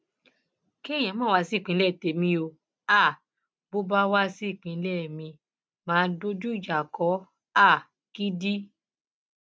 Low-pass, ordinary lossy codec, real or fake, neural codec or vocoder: none; none; real; none